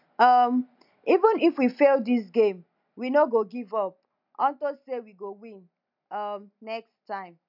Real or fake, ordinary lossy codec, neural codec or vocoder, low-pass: real; none; none; 5.4 kHz